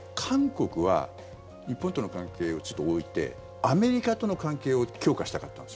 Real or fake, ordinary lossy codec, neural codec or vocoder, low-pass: real; none; none; none